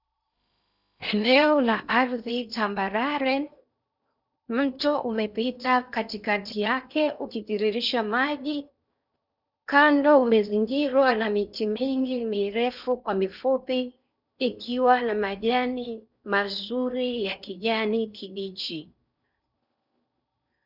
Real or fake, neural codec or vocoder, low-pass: fake; codec, 16 kHz in and 24 kHz out, 0.8 kbps, FocalCodec, streaming, 65536 codes; 5.4 kHz